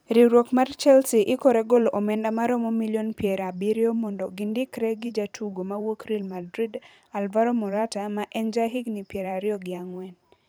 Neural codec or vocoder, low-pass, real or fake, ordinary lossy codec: vocoder, 44.1 kHz, 128 mel bands every 512 samples, BigVGAN v2; none; fake; none